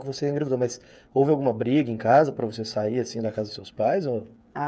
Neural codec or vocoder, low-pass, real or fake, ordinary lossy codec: codec, 16 kHz, 8 kbps, FreqCodec, smaller model; none; fake; none